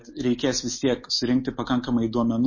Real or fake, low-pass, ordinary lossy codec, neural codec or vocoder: real; 7.2 kHz; MP3, 32 kbps; none